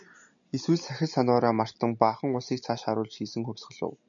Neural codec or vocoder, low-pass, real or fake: none; 7.2 kHz; real